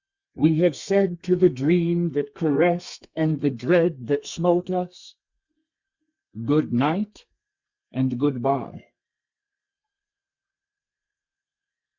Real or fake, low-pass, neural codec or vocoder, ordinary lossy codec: fake; 7.2 kHz; codec, 32 kHz, 1.9 kbps, SNAC; Opus, 64 kbps